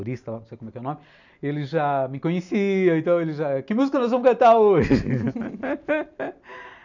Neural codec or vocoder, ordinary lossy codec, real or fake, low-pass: none; none; real; 7.2 kHz